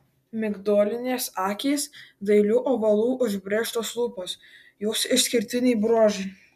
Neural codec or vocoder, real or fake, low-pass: none; real; 14.4 kHz